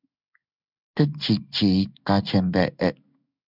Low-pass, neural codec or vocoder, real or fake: 5.4 kHz; codec, 16 kHz in and 24 kHz out, 1 kbps, XY-Tokenizer; fake